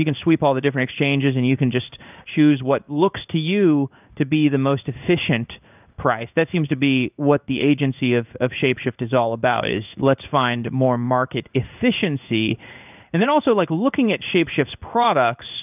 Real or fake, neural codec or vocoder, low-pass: fake; codec, 16 kHz in and 24 kHz out, 1 kbps, XY-Tokenizer; 3.6 kHz